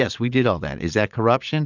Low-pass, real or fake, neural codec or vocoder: 7.2 kHz; fake; vocoder, 22.05 kHz, 80 mel bands, Vocos